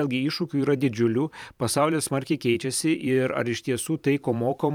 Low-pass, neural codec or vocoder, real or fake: 19.8 kHz; vocoder, 44.1 kHz, 128 mel bands every 512 samples, BigVGAN v2; fake